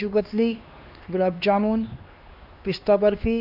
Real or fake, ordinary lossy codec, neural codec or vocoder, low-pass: fake; MP3, 48 kbps; codec, 16 kHz, 2 kbps, X-Codec, HuBERT features, trained on LibriSpeech; 5.4 kHz